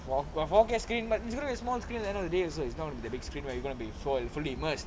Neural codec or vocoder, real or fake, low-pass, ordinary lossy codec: none; real; none; none